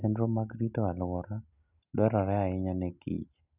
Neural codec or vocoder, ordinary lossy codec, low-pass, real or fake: none; none; 3.6 kHz; real